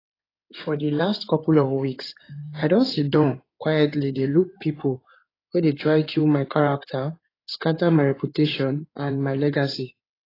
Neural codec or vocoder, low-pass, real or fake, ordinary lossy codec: codec, 16 kHz in and 24 kHz out, 2.2 kbps, FireRedTTS-2 codec; 5.4 kHz; fake; AAC, 24 kbps